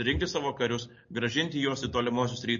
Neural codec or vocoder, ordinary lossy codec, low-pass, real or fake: none; MP3, 32 kbps; 7.2 kHz; real